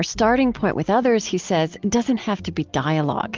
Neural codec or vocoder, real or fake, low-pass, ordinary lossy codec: none; real; 7.2 kHz; Opus, 32 kbps